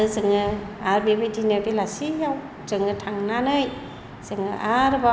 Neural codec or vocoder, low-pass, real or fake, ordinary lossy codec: none; none; real; none